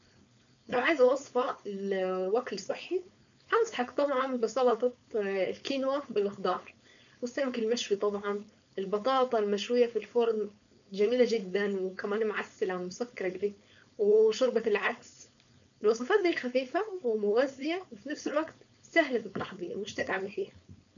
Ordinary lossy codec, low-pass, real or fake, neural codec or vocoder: none; 7.2 kHz; fake; codec, 16 kHz, 4.8 kbps, FACodec